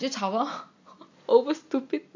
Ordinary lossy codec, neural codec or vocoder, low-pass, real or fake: MP3, 48 kbps; none; 7.2 kHz; real